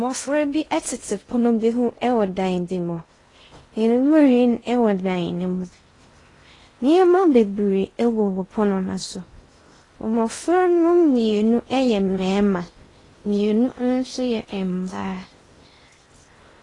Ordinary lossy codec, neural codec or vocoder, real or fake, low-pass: AAC, 32 kbps; codec, 16 kHz in and 24 kHz out, 0.6 kbps, FocalCodec, streaming, 2048 codes; fake; 10.8 kHz